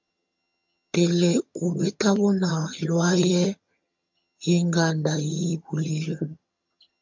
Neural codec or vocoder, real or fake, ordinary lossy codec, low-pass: vocoder, 22.05 kHz, 80 mel bands, HiFi-GAN; fake; MP3, 64 kbps; 7.2 kHz